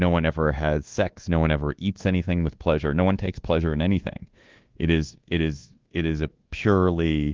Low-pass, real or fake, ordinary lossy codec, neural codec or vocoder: 7.2 kHz; fake; Opus, 16 kbps; codec, 24 kHz, 1.2 kbps, DualCodec